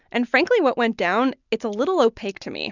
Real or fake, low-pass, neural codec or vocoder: real; 7.2 kHz; none